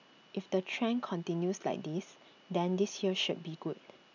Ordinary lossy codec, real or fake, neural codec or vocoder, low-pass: none; real; none; 7.2 kHz